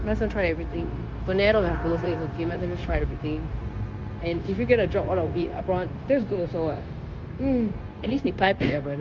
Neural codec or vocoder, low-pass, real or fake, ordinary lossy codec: codec, 16 kHz, 0.9 kbps, LongCat-Audio-Codec; 7.2 kHz; fake; Opus, 32 kbps